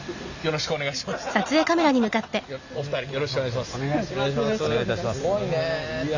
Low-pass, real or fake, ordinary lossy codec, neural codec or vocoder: 7.2 kHz; real; none; none